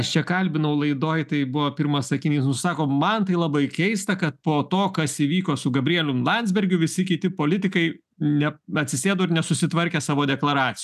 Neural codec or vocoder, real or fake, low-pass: none; real; 14.4 kHz